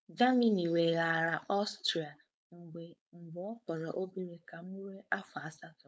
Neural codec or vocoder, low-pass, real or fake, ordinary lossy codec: codec, 16 kHz, 4.8 kbps, FACodec; none; fake; none